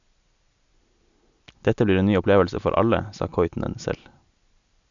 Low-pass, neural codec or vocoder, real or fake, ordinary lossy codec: 7.2 kHz; none; real; none